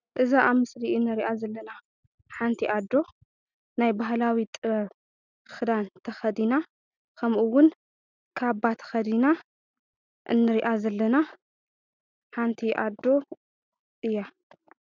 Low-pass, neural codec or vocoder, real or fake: 7.2 kHz; none; real